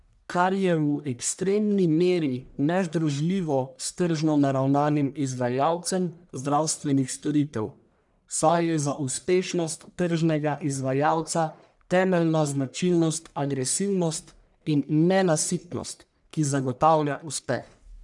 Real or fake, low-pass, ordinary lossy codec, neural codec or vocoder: fake; 10.8 kHz; none; codec, 44.1 kHz, 1.7 kbps, Pupu-Codec